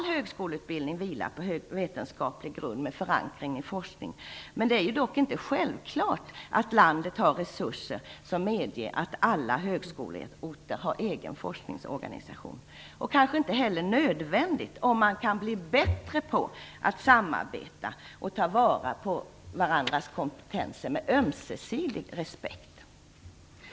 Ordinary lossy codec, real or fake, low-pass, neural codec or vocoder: none; real; none; none